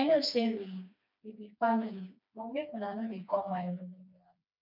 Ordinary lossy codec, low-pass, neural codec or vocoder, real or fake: none; 5.4 kHz; codec, 16 kHz, 2 kbps, FreqCodec, smaller model; fake